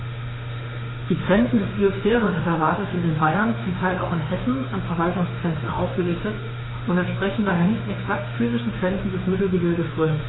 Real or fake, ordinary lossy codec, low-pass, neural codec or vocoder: fake; AAC, 16 kbps; 7.2 kHz; autoencoder, 48 kHz, 32 numbers a frame, DAC-VAE, trained on Japanese speech